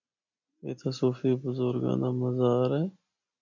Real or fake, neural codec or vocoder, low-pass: real; none; 7.2 kHz